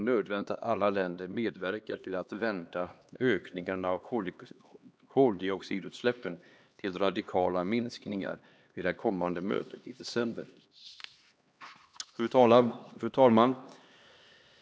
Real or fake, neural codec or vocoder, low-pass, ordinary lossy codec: fake; codec, 16 kHz, 2 kbps, X-Codec, HuBERT features, trained on LibriSpeech; none; none